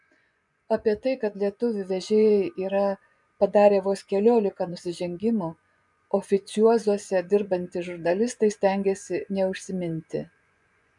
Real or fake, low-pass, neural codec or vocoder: fake; 10.8 kHz; vocoder, 24 kHz, 100 mel bands, Vocos